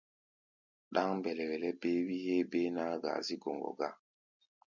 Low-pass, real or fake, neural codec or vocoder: 7.2 kHz; real; none